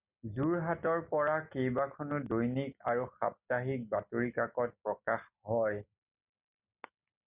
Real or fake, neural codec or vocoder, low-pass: real; none; 3.6 kHz